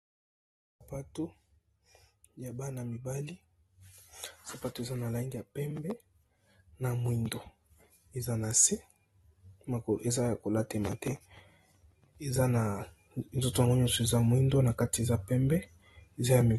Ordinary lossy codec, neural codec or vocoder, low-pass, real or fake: AAC, 32 kbps; none; 14.4 kHz; real